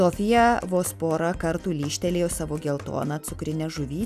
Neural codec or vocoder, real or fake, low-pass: none; real; 14.4 kHz